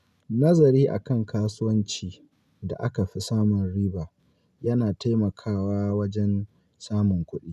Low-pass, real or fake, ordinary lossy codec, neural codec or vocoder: 14.4 kHz; real; none; none